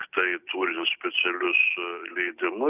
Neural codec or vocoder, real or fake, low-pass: none; real; 3.6 kHz